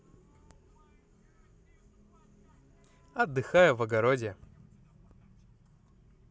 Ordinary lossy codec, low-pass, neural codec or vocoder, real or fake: none; none; none; real